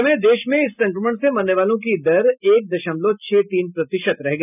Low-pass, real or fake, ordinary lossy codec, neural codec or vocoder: 3.6 kHz; real; none; none